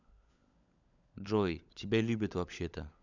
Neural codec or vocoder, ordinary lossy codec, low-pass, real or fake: codec, 16 kHz, 16 kbps, FunCodec, trained on LibriTTS, 50 frames a second; none; 7.2 kHz; fake